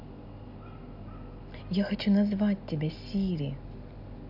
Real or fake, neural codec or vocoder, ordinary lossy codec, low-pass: real; none; none; 5.4 kHz